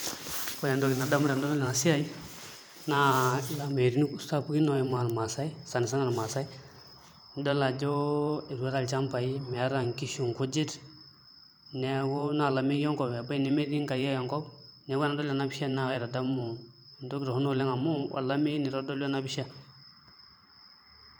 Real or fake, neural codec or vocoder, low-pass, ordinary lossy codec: fake; vocoder, 44.1 kHz, 128 mel bands every 512 samples, BigVGAN v2; none; none